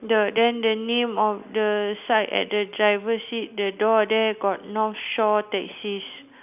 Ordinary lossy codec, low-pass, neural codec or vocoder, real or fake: none; 3.6 kHz; none; real